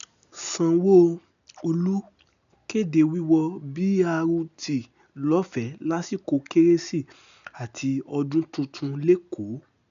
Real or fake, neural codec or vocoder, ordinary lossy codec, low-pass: real; none; none; 7.2 kHz